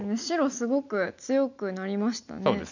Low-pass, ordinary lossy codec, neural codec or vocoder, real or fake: 7.2 kHz; none; none; real